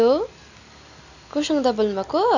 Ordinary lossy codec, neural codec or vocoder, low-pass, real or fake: none; none; 7.2 kHz; real